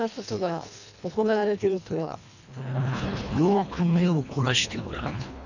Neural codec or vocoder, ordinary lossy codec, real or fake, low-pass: codec, 24 kHz, 1.5 kbps, HILCodec; none; fake; 7.2 kHz